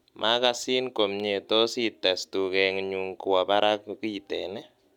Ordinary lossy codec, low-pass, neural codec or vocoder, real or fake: none; 19.8 kHz; none; real